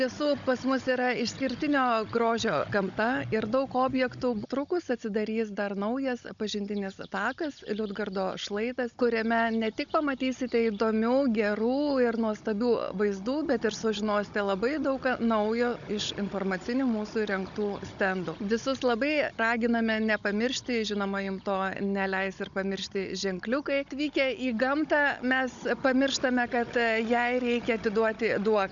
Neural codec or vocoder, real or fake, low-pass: codec, 16 kHz, 16 kbps, FunCodec, trained on Chinese and English, 50 frames a second; fake; 7.2 kHz